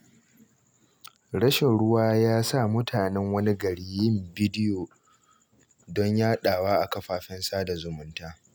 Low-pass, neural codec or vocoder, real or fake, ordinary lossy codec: none; none; real; none